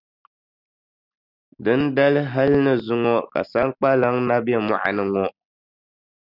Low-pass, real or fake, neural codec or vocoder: 5.4 kHz; real; none